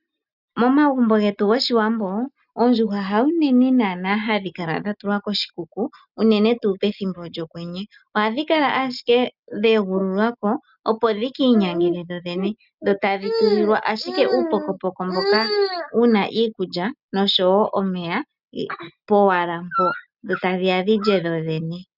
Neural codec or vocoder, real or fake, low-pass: none; real; 5.4 kHz